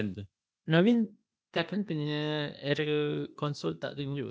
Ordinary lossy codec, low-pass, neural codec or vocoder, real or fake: none; none; codec, 16 kHz, 0.8 kbps, ZipCodec; fake